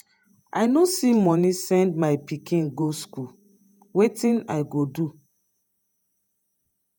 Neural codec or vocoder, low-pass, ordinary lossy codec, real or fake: vocoder, 48 kHz, 128 mel bands, Vocos; none; none; fake